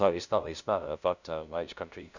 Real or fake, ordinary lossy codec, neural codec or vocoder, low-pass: fake; none; codec, 16 kHz, 0.5 kbps, FunCodec, trained on LibriTTS, 25 frames a second; 7.2 kHz